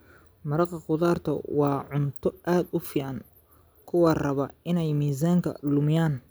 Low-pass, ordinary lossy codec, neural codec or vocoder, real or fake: none; none; none; real